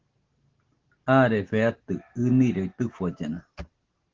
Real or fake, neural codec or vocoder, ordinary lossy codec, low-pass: real; none; Opus, 16 kbps; 7.2 kHz